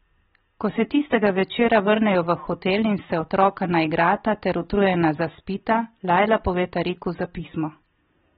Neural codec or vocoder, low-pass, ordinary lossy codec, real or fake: none; 19.8 kHz; AAC, 16 kbps; real